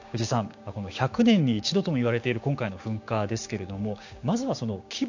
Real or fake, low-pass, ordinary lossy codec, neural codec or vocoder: fake; 7.2 kHz; AAC, 48 kbps; codec, 16 kHz, 6 kbps, DAC